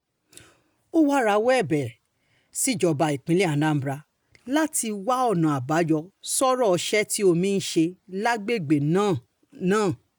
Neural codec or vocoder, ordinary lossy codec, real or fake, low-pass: none; none; real; none